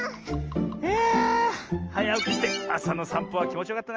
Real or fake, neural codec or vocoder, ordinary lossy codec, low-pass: real; none; Opus, 24 kbps; 7.2 kHz